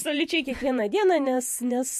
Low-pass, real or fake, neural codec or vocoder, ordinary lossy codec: 14.4 kHz; fake; vocoder, 48 kHz, 128 mel bands, Vocos; MP3, 64 kbps